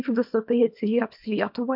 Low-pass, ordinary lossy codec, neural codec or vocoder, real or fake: 5.4 kHz; MP3, 48 kbps; codec, 24 kHz, 0.9 kbps, WavTokenizer, small release; fake